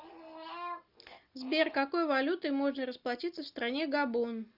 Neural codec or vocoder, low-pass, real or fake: none; 5.4 kHz; real